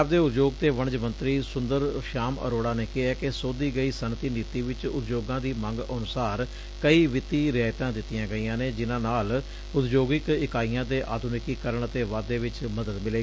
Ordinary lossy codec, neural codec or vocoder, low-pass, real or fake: none; none; none; real